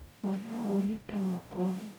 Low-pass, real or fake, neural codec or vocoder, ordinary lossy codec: none; fake; codec, 44.1 kHz, 0.9 kbps, DAC; none